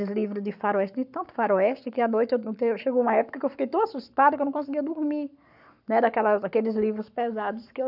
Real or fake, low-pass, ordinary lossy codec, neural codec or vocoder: fake; 5.4 kHz; none; codec, 44.1 kHz, 7.8 kbps, Pupu-Codec